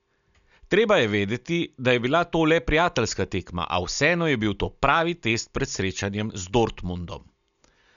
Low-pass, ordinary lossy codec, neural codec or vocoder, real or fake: 7.2 kHz; none; none; real